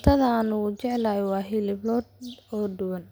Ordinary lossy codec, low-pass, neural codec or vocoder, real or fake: none; none; none; real